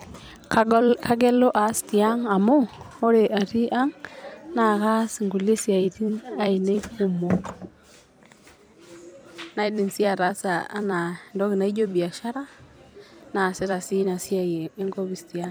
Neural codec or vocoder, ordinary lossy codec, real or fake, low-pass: vocoder, 44.1 kHz, 128 mel bands every 256 samples, BigVGAN v2; none; fake; none